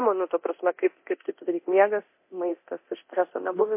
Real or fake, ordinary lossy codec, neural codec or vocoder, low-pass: fake; MP3, 24 kbps; codec, 24 kHz, 0.9 kbps, DualCodec; 3.6 kHz